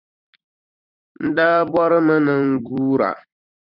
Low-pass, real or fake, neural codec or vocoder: 5.4 kHz; fake; vocoder, 44.1 kHz, 128 mel bands every 256 samples, BigVGAN v2